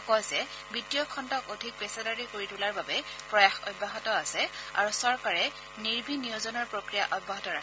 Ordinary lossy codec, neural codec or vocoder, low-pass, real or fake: none; none; none; real